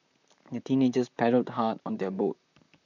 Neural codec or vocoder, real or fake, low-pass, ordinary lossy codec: vocoder, 44.1 kHz, 128 mel bands, Pupu-Vocoder; fake; 7.2 kHz; none